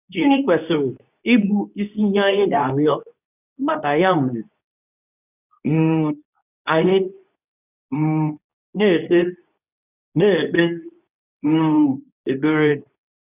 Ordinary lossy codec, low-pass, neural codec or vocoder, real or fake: none; 3.6 kHz; codec, 24 kHz, 0.9 kbps, WavTokenizer, medium speech release version 2; fake